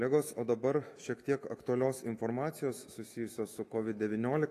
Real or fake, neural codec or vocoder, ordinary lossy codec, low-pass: fake; autoencoder, 48 kHz, 128 numbers a frame, DAC-VAE, trained on Japanese speech; AAC, 48 kbps; 14.4 kHz